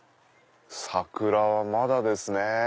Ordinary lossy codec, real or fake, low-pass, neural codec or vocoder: none; real; none; none